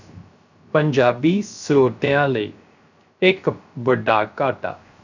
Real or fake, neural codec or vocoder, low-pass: fake; codec, 16 kHz, 0.3 kbps, FocalCodec; 7.2 kHz